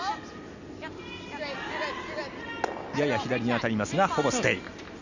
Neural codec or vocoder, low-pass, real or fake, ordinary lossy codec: none; 7.2 kHz; real; none